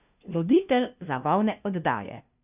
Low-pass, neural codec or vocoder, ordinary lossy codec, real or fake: 3.6 kHz; codec, 16 kHz, 0.5 kbps, FunCodec, trained on LibriTTS, 25 frames a second; none; fake